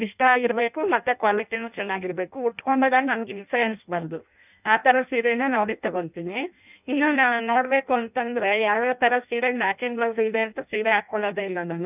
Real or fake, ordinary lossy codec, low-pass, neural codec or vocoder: fake; none; 3.6 kHz; codec, 16 kHz in and 24 kHz out, 0.6 kbps, FireRedTTS-2 codec